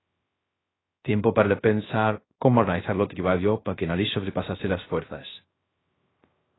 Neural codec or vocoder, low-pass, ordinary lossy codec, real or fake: codec, 16 kHz, 0.3 kbps, FocalCodec; 7.2 kHz; AAC, 16 kbps; fake